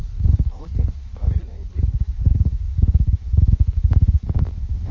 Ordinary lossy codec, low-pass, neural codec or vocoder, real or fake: MP3, 32 kbps; 7.2 kHz; codec, 16 kHz in and 24 kHz out, 1.1 kbps, FireRedTTS-2 codec; fake